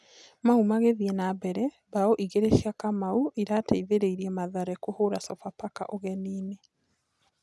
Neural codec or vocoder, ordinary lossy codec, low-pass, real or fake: none; none; none; real